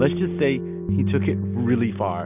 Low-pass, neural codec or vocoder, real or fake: 3.6 kHz; none; real